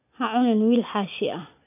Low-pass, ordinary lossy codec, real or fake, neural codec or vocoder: 3.6 kHz; none; real; none